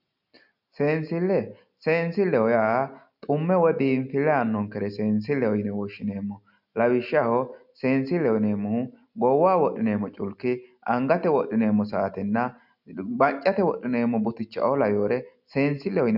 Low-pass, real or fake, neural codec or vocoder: 5.4 kHz; real; none